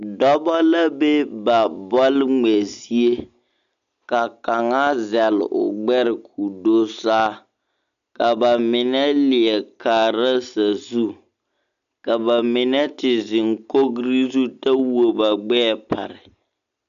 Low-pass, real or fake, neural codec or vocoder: 7.2 kHz; real; none